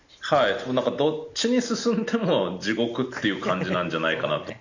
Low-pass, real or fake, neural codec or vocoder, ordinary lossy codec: 7.2 kHz; real; none; none